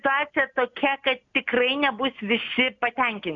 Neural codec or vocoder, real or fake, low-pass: none; real; 7.2 kHz